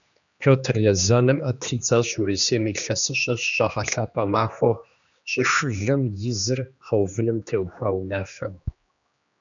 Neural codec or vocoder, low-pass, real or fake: codec, 16 kHz, 2 kbps, X-Codec, HuBERT features, trained on general audio; 7.2 kHz; fake